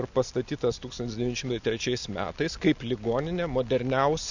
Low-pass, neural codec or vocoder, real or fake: 7.2 kHz; none; real